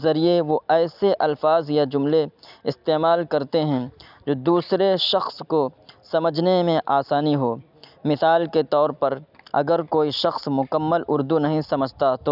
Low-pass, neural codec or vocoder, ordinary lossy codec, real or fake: 5.4 kHz; none; none; real